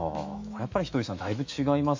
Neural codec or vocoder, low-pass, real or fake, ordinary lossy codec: autoencoder, 48 kHz, 128 numbers a frame, DAC-VAE, trained on Japanese speech; 7.2 kHz; fake; MP3, 48 kbps